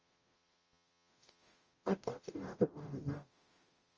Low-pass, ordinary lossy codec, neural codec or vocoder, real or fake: 7.2 kHz; Opus, 24 kbps; codec, 44.1 kHz, 0.9 kbps, DAC; fake